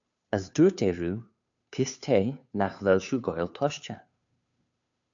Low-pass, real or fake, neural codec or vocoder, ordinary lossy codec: 7.2 kHz; fake; codec, 16 kHz, 2 kbps, FunCodec, trained on Chinese and English, 25 frames a second; AAC, 64 kbps